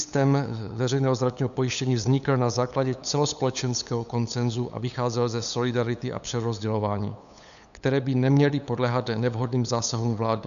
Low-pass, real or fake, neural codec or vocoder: 7.2 kHz; fake; codec, 16 kHz, 8 kbps, FunCodec, trained on LibriTTS, 25 frames a second